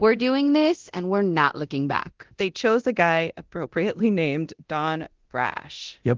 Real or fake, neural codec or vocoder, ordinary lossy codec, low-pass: fake; codec, 24 kHz, 0.9 kbps, DualCodec; Opus, 16 kbps; 7.2 kHz